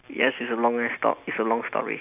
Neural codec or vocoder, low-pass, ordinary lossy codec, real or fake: none; 3.6 kHz; none; real